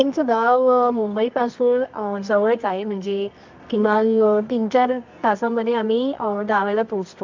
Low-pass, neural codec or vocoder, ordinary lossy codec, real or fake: 7.2 kHz; codec, 24 kHz, 0.9 kbps, WavTokenizer, medium music audio release; MP3, 64 kbps; fake